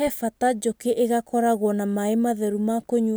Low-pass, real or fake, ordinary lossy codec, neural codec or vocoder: none; real; none; none